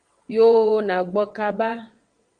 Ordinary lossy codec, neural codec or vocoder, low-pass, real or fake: Opus, 24 kbps; vocoder, 22.05 kHz, 80 mel bands, WaveNeXt; 9.9 kHz; fake